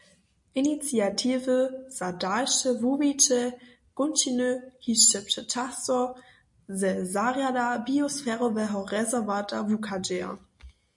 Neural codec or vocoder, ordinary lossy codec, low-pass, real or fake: none; MP3, 48 kbps; 10.8 kHz; real